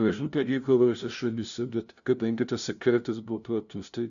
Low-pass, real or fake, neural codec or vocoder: 7.2 kHz; fake; codec, 16 kHz, 0.5 kbps, FunCodec, trained on LibriTTS, 25 frames a second